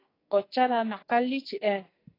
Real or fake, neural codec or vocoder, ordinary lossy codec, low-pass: fake; codec, 44.1 kHz, 2.6 kbps, SNAC; AAC, 24 kbps; 5.4 kHz